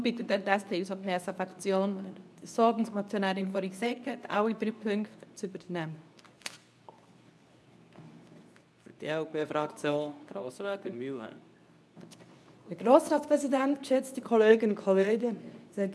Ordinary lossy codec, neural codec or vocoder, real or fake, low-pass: none; codec, 24 kHz, 0.9 kbps, WavTokenizer, medium speech release version 2; fake; none